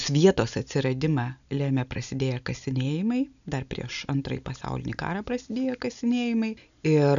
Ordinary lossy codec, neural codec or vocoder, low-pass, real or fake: AAC, 96 kbps; none; 7.2 kHz; real